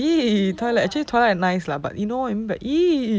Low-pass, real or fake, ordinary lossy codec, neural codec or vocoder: none; real; none; none